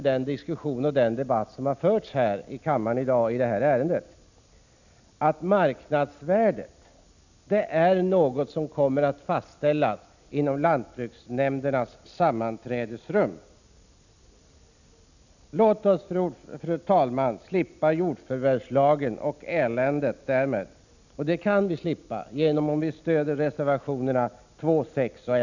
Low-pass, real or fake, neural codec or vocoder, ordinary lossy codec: 7.2 kHz; real; none; none